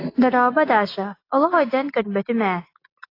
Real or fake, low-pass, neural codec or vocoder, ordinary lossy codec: real; 5.4 kHz; none; AAC, 32 kbps